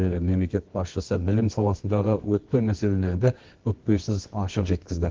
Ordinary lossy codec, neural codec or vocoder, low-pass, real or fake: Opus, 16 kbps; codec, 24 kHz, 0.9 kbps, WavTokenizer, medium music audio release; 7.2 kHz; fake